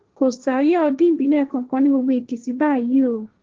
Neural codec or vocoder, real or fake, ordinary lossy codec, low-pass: codec, 16 kHz, 1.1 kbps, Voila-Tokenizer; fake; Opus, 16 kbps; 7.2 kHz